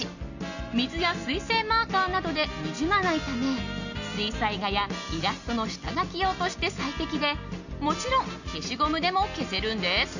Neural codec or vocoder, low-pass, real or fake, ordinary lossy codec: none; 7.2 kHz; real; none